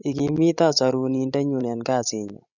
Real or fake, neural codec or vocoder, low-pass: fake; codec, 16 kHz, 16 kbps, FreqCodec, larger model; 7.2 kHz